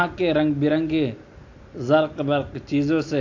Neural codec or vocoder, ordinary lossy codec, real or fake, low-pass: none; AAC, 48 kbps; real; 7.2 kHz